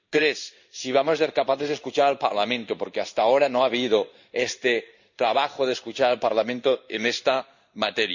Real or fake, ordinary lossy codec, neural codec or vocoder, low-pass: fake; none; codec, 16 kHz in and 24 kHz out, 1 kbps, XY-Tokenizer; 7.2 kHz